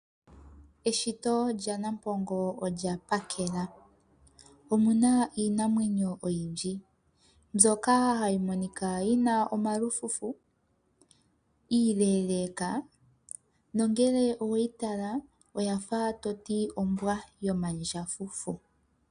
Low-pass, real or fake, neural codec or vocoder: 9.9 kHz; real; none